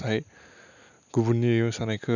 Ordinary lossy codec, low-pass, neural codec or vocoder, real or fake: none; 7.2 kHz; none; real